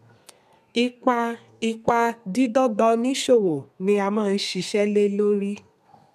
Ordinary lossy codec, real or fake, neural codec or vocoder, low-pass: none; fake; codec, 32 kHz, 1.9 kbps, SNAC; 14.4 kHz